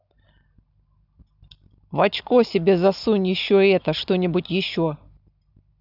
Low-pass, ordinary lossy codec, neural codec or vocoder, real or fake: 5.4 kHz; none; none; real